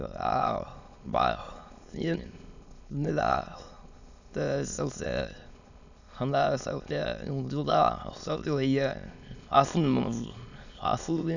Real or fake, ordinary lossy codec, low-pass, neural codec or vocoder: fake; none; 7.2 kHz; autoencoder, 22.05 kHz, a latent of 192 numbers a frame, VITS, trained on many speakers